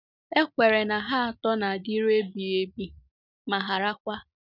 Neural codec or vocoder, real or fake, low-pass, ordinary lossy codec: none; real; 5.4 kHz; none